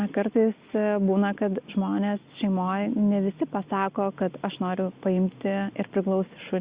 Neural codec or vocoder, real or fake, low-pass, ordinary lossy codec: none; real; 3.6 kHz; Opus, 64 kbps